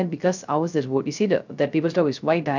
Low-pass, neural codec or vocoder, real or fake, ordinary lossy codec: 7.2 kHz; codec, 16 kHz, 0.3 kbps, FocalCodec; fake; none